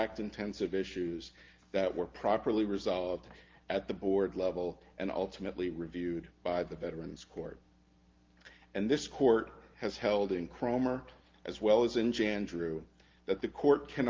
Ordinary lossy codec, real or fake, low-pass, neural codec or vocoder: Opus, 32 kbps; real; 7.2 kHz; none